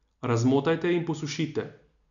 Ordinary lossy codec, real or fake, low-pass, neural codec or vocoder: none; real; 7.2 kHz; none